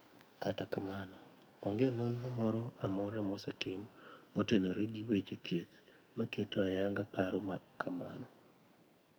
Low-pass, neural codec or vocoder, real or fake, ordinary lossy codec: none; codec, 44.1 kHz, 2.6 kbps, SNAC; fake; none